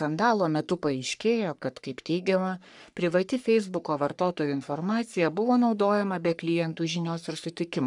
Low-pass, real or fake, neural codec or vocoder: 10.8 kHz; fake; codec, 44.1 kHz, 3.4 kbps, Pupu-Codec